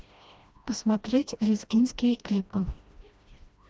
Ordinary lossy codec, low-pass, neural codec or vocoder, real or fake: none; none; codec, 16 kHz, 1 kbps, FreqCodec, smaller model; fake